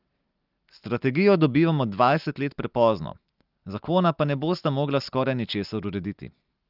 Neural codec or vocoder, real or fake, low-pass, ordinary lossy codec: none; real; 5.4 kHz; Opus, 32 kbps